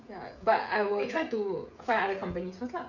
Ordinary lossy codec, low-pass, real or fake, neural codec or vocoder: none; 7.2 kHz; fake; codec, 16 kHz, 16 kbps, FreqCodec, smaller model